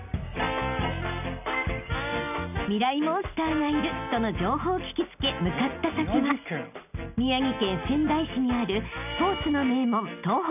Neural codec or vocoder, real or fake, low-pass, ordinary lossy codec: none; real; 3.6 kHz; none